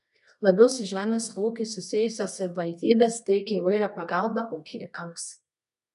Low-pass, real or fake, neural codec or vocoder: 10.8 kHz; fake; codec, 24 kHz, 0.9 kbps, WavTokenizer, medium music audio release